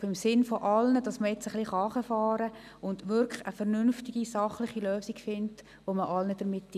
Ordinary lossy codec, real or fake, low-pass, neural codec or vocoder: none; real; 14.4 kHz; none